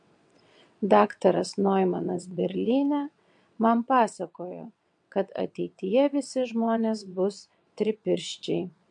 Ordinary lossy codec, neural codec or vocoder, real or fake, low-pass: MP3, 64 kbps; vocoder, 22.05 kHz, 80 mel bands, WaveNeXt; fake; 9.9 kHz